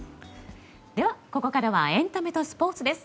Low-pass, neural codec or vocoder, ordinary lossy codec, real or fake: none; none; none; real